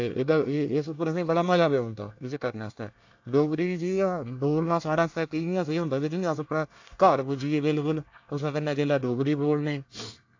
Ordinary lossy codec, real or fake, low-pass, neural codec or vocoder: AAC, 48 kbps; fake; 7.2 kHz; codec, 24 kHz, 1 kbps, SNAC